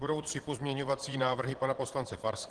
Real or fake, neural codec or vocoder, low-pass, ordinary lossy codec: fake; vocoder, 22.05 kHz, 80 mel bands, Vocos; 9.9 kHz; Opus, 16 kbps